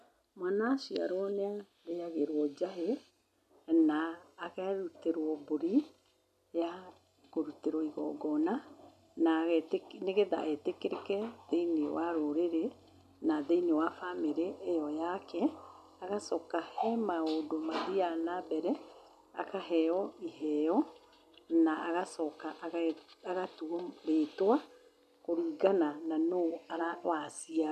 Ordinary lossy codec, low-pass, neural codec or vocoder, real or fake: none; 14.4 kHz; none; real